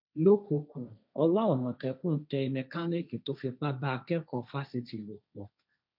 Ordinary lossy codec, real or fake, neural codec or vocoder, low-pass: none; fake; codec, 16 kHz, 1.1 kbps, Voila-Tokenizer; 5.4 kHz